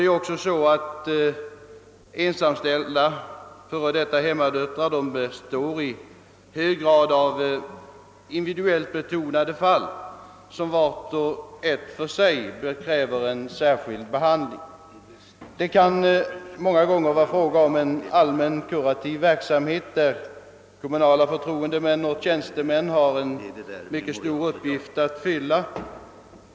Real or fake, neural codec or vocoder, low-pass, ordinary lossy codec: real; none; none; none